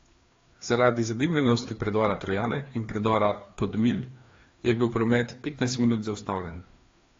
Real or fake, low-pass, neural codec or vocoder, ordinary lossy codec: fake; 7.2 kHz; codec, 16 kHz, 2 kbps, FreqCodec, larger model; AAC, 32 kbps